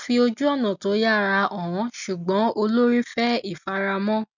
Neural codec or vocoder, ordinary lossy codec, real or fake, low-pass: none; none; real; 7.2 kHz